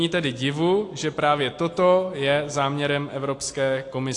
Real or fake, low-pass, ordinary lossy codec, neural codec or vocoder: real; 10.8 kHz; AAC, 48 kbps; none